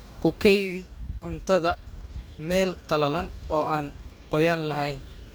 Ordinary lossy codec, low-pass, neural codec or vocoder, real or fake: none; none; codec, 44.1 kHz, 2.6 kbps, DAC; fake